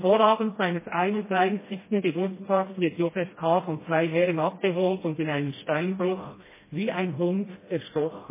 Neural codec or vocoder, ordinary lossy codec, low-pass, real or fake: codec, 16 kHz, 1 kbps, FreqCodec, smaller model; MP3, 16 kbps; 3.6 kHz; fake